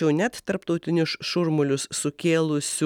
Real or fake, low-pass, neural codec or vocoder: real; 19.8 kHz; none